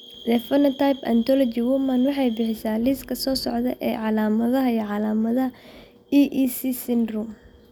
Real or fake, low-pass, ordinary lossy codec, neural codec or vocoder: real; none; none; none